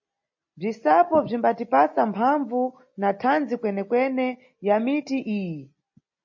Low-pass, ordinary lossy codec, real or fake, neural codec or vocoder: 7.2 kHz; MP3, 32 kbps; real; none